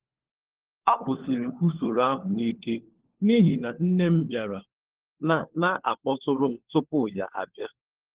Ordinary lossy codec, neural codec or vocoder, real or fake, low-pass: Opus, 16 kbps; codec, 16 kHz, 4 kbps, FunCodec, trained on LibriTTS, 50 frames a second; fake; 3.6 kHz